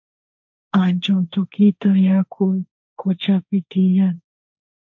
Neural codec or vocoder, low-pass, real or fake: codec, 16 kHz, 1.1 kbps, Voila-Tokenizer; 7.2 kHz; fake